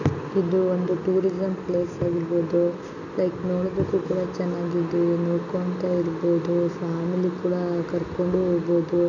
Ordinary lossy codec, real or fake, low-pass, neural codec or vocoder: none; real; 7.2 kHz; none